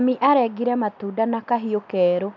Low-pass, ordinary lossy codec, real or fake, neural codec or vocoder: 7.2 kHz; none; real; none